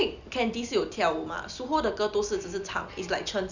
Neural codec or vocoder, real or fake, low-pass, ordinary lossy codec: none; real; 7.2 kHz; none